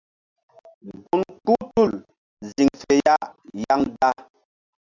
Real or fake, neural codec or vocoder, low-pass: real; none; 7.2 kHz